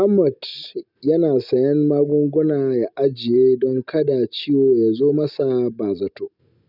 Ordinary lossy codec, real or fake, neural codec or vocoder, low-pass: none; real; none; 5.4 kHz